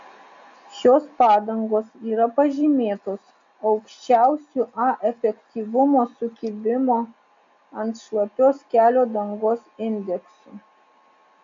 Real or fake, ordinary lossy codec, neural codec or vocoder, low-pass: real; MP3, 48 kbps; none; 7.2 kHz